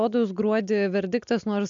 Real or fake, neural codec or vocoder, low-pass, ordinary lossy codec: real; none; 7.2 kHz; MP3, 96 kbps